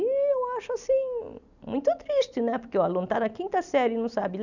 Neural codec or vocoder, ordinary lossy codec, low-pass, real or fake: none; none; 7.2 kHz; real